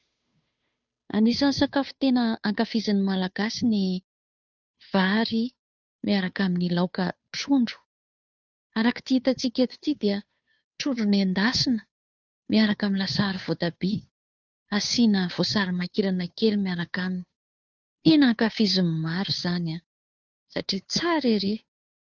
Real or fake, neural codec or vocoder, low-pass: fake; codec, 16 kHz, 2 kbps, FunCodec, trained on Chinese and English, 25 frames a second; 7.2 kHz